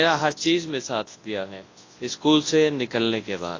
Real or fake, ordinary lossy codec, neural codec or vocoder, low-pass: fake; AAC, 32 kbps; codec, 24 kHz, 0.9 kbps, WavTokenizer, large speech release; 7.2 kHz